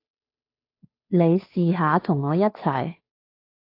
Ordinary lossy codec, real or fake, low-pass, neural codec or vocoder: AAC, 32 kbps; fake; 5.4 kHz; codec, 16 kHz, 2 kbps, FunCodec, trained on Chinese and English, 25 frames a second